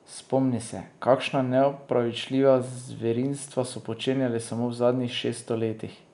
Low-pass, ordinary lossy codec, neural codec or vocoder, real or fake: 10.8 kHz; none; none; real